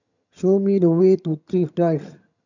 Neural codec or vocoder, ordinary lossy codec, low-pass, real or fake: vocoder, 22.05 kHz, 80 mel bands, HiFi-GAN; none; 7.2 kHz; fake